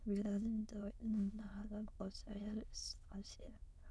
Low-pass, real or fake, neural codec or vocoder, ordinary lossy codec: none; fake; autoencoder, 22.05 kHz, a latent of 192 numbers a frame, VITS, trained on many speakers; none